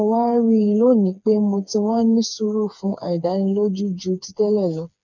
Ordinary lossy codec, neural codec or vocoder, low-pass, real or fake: none; codec, 16 kHz, 4 kbps, FreqCodec, smaller model; 7.2 kHz; fake